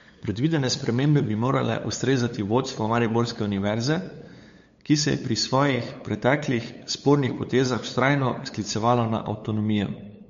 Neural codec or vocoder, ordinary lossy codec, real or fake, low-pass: codec, 16 kHz, 8 kbps, FunCodec, trained on LibriTTS, 25 frames a second; MP3, 48 kbps; fake; 7.2 kHz